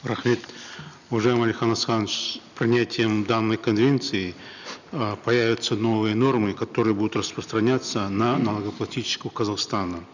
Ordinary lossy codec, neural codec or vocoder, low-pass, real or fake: none; none; 7.2 kHz; real